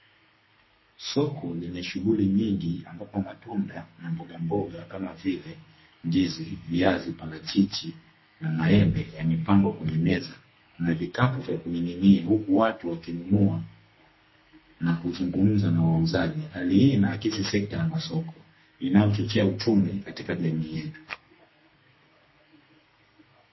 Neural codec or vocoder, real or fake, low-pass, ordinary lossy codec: codec, 32 kHz, 1.9 kbps, SNAC; fake; 7.2 kHz; MP3, 24 kbps